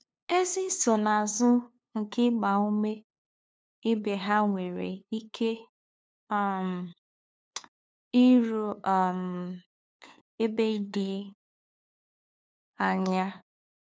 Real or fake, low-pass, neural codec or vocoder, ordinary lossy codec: fake; none; codec, 16 kHz, 2 kbps, FunCodec, trained on LibriTTS, 25 frames a second; none